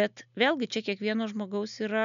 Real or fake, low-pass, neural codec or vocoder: real; 7.2 kHz; none